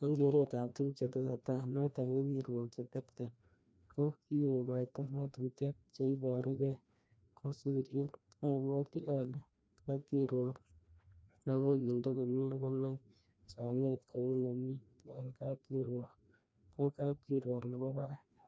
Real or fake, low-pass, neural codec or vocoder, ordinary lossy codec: fake; none; codec, 16 kHz, 1 kbps, FreqCodec, larger model; none